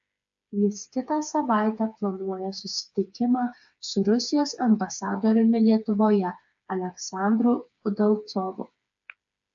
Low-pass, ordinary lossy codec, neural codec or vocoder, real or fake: 7.2 kHz; AAC, 64 kbps; codec, 16 kHz, 4 kbps, FreqCodec, smaller model; fake